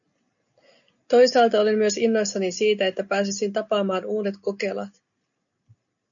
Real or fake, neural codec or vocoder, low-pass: real; none; 7.2 kHz